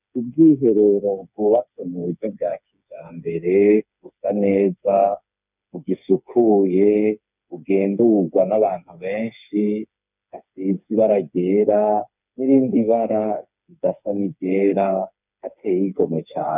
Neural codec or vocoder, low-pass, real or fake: codec, 16 kHz, 4 kbps, FreqCodec, smaller model; 3.6 kHz; fake